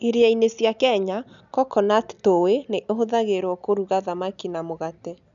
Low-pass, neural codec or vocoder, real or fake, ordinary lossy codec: 7.2 kHz; none; real; none